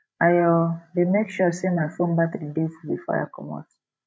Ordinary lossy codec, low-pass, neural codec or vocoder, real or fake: none; none; codec, 16 kHz, 16 kbps, FreqCodec, larger model; fake